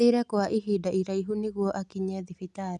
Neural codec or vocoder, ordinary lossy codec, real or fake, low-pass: none; none; real; none